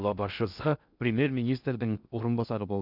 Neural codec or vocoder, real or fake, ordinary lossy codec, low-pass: codec, 16 kHz in and 24 kHz out, 0.6 kbps, FocalCodec, streaming, 4096 codes; fake; none; 5.4 kHz